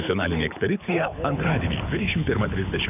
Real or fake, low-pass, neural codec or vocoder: fake; 3.6 kHz; codec, 24 kHz, 6 kbps, HILCodec